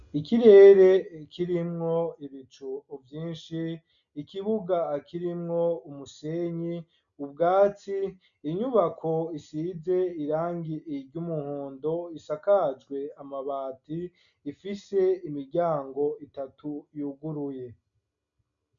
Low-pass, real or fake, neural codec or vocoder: 7.2 kHz; real; none